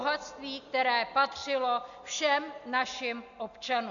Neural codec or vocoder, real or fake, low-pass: none; real; 7.2 kHz